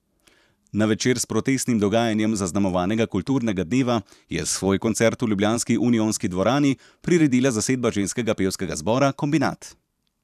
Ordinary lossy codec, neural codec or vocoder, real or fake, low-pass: none; vocoder, 44.1 kHz, 128 mel bands every 512 samples, BigVGAN v2; fake; 14.4 kHz